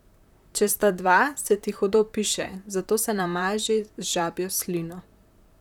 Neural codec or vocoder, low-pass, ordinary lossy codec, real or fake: vocoder, 44.1 kHz, 128 mel bands, Pupu-Vocoder; 19.8 kHz; none; fake